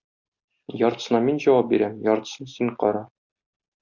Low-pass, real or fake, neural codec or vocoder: 7.2 kHz; real; none